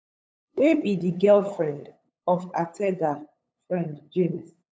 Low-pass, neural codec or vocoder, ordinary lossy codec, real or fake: none; codec, 16 kHz, 8 kbps, FunCodec, trained on LibriTTS, 25 frames a second; none; fake